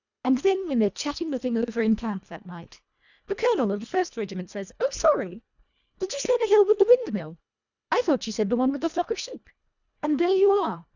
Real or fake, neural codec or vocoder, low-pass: fake; codec, 24 kHz, 1.5 kbps, HILCodec; 7.2 kHz